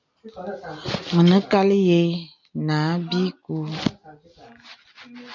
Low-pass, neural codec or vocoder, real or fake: 7.2 kHz; none; real